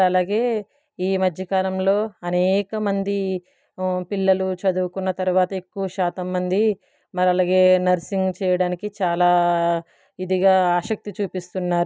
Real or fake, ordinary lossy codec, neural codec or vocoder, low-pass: real; none; none; none